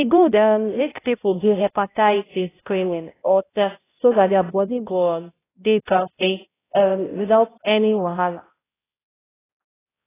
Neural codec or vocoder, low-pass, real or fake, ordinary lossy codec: codec, 16 kHz, 0.5 kbps, X-Codec, HuBERT features, trained on balanced general audio; 3.6 kHz; fake; AAC, 16 kbps